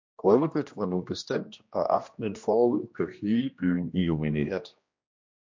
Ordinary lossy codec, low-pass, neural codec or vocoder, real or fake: MP3, 48 kbps; 7.2 kHz; codec, 16 kHz, 1 kbps, X-Codec, HuBERT features, trained on general audio; fake